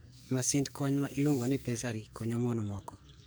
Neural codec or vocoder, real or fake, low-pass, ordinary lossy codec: codec, 44.1 kHz, 2.6 kbps, SNAC; fake; none; none